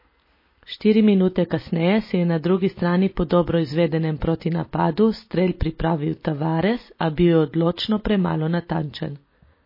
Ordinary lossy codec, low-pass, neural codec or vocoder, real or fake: MP3, 24 kbps; 5.4 kHz; none; real